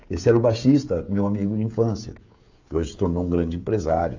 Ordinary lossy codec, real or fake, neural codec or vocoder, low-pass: AAC, 48 kbps; fake; codec, 16 kHz, 16 kbps, FreqCodec, smaller model; 7.2 kHz